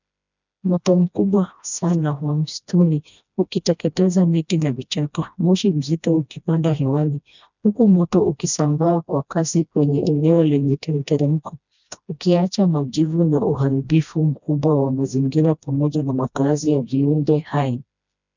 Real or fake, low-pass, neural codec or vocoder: fake; 7.2 kHz; codec, 16 kHz, 1 kbps, FreqCodec, smaller model